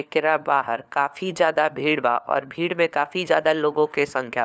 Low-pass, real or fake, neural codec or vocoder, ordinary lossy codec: none; fake; codec, 16 kHz, 4 kbps, FunCodec, trained on LibriTTS, 50 frames a second; none